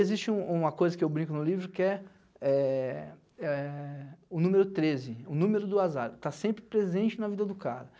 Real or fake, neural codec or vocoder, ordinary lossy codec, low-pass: real; none; none; none